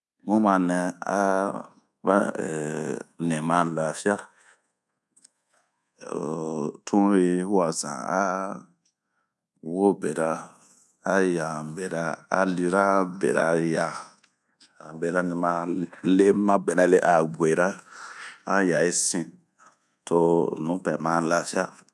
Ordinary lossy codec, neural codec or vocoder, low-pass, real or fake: none; codec, 24 kHz, 1.2 kbps, DualCodec; none; fake